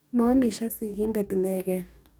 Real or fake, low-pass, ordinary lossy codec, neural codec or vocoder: fake; none; none; codec, 44.1 kHz, 2.6 kbps, DAC